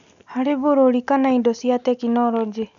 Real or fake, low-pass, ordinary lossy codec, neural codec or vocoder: real; 7.2 kHz; none; none